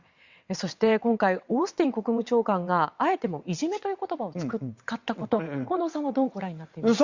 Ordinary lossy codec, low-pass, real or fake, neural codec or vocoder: Opus, 64 kbps; 7.2 kHz; fake; vocoder, 22.05 kHz, 80 mel bands, WaveNeXt